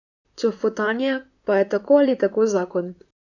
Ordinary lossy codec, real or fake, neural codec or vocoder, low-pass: none; fake; vocoder, 44.1 kHz, 128 mel bands, Pupu-Vocoder; 7.2 kHz